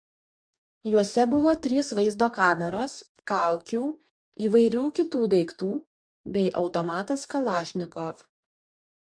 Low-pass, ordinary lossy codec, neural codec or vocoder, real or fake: 9.9 kHz; MP3, 64 kbps; codec, 44.1 kHz, 2.6 kbps, DAC; fake